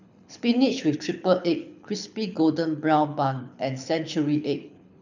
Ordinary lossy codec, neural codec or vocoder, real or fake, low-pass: none; codec, 24 kHz, 6 kbps, HILCodec; fake; 7.2 kHz